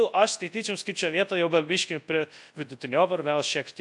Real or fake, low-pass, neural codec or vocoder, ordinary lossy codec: fake; 10.8 kHz; codec, 24 kHz, 0.9 kbps, WavTokenizer, large speech release; AAC, 64 kbps